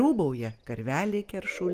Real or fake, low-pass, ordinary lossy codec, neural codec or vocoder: real; 14.4 kHz; Opus, 32 kbps; none